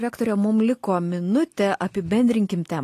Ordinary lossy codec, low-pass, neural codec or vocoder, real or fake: AAC, 48 kbps; 14.4 kHz; none; real